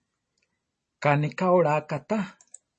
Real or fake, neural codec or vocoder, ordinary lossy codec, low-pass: real; none; MP3, 32 kbps; 10.8 kHz